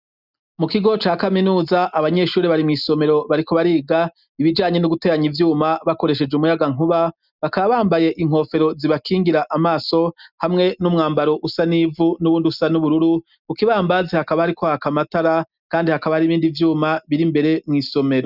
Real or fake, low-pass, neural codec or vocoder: real; 5.4 kHz; none